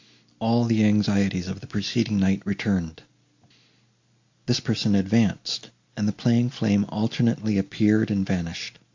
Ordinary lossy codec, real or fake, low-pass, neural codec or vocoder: MP3, 48 kbps; real; 7.2 kHz; none